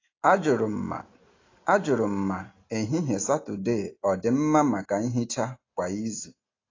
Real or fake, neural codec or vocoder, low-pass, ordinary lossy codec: real; none; 7.2 kHz; AAC, 32 kbps